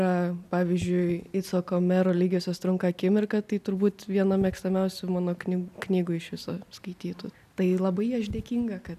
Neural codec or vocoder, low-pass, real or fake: none; 14.4 kHz; real